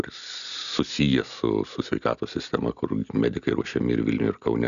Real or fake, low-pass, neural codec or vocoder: real; 7.2 kHz; none